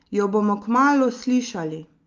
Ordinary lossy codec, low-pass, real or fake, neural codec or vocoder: Opus, 24 kbps; 7.2 kHz; real; none